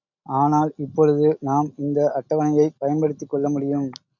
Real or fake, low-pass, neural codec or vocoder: real; 7.2 kHz; none